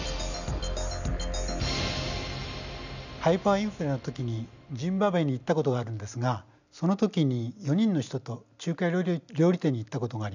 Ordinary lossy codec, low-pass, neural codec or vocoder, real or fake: none; 7.2 kHz; none; real